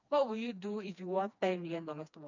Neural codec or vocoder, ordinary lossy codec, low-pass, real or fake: codec, 16 kHz, 2 kbps, FreqCodec, smaller model; none; 7.2 kHz; fake